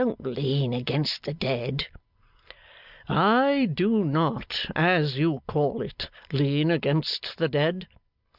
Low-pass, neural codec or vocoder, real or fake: 5.4 kHz; none; real